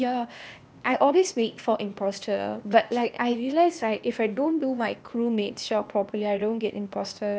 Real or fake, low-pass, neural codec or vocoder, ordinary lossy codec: fake; none; codec, 16 kHz, 0.8 kbps, ZipCodec; none